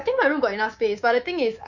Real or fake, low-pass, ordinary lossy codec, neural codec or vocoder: fake; 7.2 kHz; none; codec, 24 kHz, 3.1 kbps, DualCodec